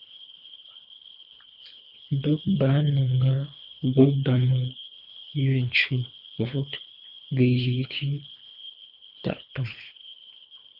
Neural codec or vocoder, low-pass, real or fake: codec, 24 kHz, 3 kbps, HILCodec; 5.4 kHz; fake